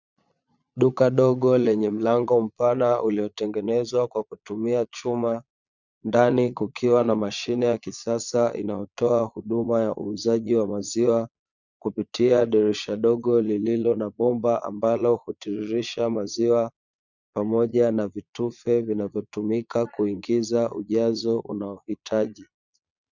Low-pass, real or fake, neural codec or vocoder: 7.2 kHz; fake; vocoder, 22.05 kHz, 80 mel bands, Vocos